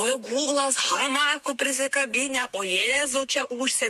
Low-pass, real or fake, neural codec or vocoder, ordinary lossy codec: 14.4 kHz; fake; codec, 32 kHz, 1.9 kbps, SNAC; MP3, 64 kbps